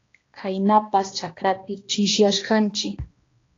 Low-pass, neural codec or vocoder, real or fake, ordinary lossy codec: 7.2 kHz; codec, 16 kHz, 1 kbps, X-Codec, HuBERT features, trained on balanced general audio; fake; AAC, 32 kbps